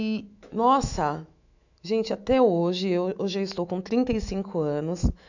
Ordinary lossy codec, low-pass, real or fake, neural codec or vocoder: none; 7.2 kHz; fake; autoencoder, 48 kHz, 128 numbers a frame, DAC-VAE, trained on Japanese speech